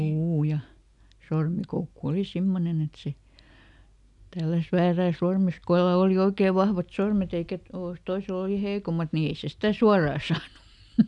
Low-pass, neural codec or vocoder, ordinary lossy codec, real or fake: 10.8 kHz; none; none; real